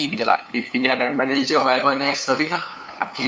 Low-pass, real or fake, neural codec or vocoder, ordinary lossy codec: none; fake; codec, 16 kHz, 2 kbps, FunCodec, trained on LibriTTS, 25 frames a second; none